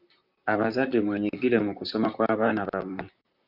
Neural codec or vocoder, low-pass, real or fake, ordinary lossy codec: vocoder, 44.1 kHz, 128 mel bands, Pupu-Vocoder; 5.4 kHz; fake; Opus, 64 kbps